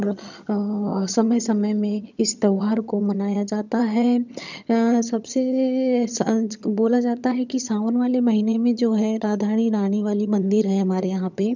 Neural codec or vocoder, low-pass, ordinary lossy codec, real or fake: vocoder, 22.05 kHz, 80 mel bands, HiFi-GAN; 7.2 kHz; none; fake